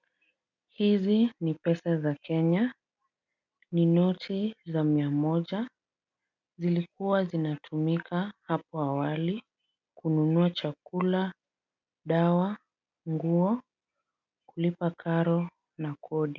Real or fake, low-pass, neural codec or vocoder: real; 7.2 kHz; none